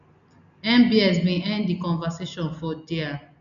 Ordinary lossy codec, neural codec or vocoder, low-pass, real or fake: none; none; 7.2 kHz; real